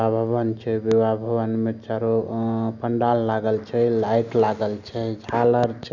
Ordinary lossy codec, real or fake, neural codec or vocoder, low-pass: none; real; none; 7.2 kHz